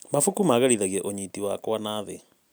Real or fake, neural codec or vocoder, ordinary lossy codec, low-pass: real; none; none; none